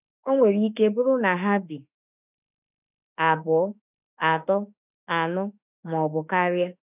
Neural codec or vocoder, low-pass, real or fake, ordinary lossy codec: autoencoder, 48 kHz, 32 numbers a frame, DAC-VAE, trained on Japanese speech; 3.6 kHz; fake; none